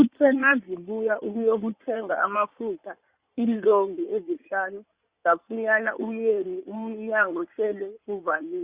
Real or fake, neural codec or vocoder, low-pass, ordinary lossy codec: fake; codec, 16 kHz in and 24 kHz out, 2.2 kbps, FireRedTTS-2 codec; 3.6 kHz; Opus, 64 kbps